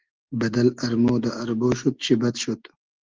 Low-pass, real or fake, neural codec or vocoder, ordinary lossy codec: 7.2 kHz; real; none; Opus, 16 kbps